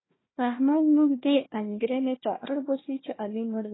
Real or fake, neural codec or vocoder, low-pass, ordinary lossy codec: fake; codec, 16 kHz, 1 kbps, FunCodec, trained on Chinese and English, 50 frames a second; 7.2 kHz; AAC, 16 kbps